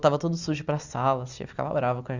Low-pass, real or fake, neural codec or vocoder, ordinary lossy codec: 7.2 kHz; real; none; none